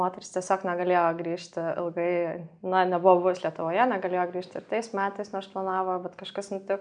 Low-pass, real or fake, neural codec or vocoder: 9.9 kHz; real; none